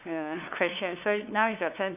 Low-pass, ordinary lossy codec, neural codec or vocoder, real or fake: 3.6 kHz; none; codec, 16 kHz, 2 kbps, FunCodec, trained on LibriTTS, 25 frames a second; fake